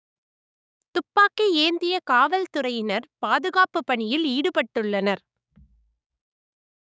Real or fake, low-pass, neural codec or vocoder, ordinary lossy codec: fake; none; codec, 16 kHz, 6 kbps, DAC; none